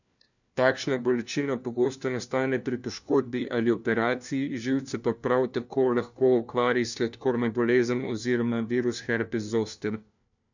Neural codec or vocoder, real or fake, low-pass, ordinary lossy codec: codec, 16 kHz, 1 kbps, FunCodec, trained on LibriTTS, 50 frames a second; fake; 7.2 kHz; none